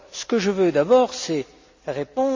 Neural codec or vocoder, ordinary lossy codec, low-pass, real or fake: none; MP3, 64 kbps; 7.2 kHz; real